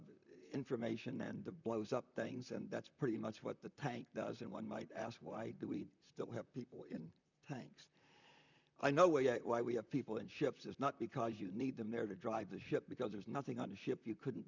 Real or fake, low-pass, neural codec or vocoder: fake; 7.2 kHz; vocoder, 44.1 kHz, 128 mel bands, Pupu-Vocoder